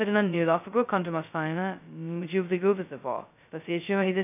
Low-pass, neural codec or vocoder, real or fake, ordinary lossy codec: 3.6 kHz; codec, 16 kHz, 0.2 kbps, FocalCodec; fake; none